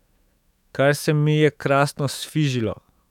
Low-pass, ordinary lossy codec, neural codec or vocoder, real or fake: 19.8 kHz; none; autoencoder, 48 kHz, 128 numbers a frame, DAC-VAE, trained on Japanese speech; fake